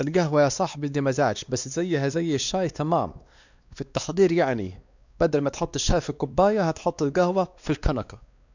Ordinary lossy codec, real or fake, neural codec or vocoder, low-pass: none; fake; codec, 16 kHz, 2 kbps, X-Codec, WavLM features, trained on Multilingual LibriSpeech; 7.2 kHz